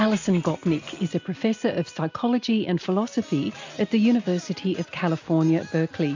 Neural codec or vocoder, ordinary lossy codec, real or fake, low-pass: none; AAC, 48 kbps; real; 7.2 kHz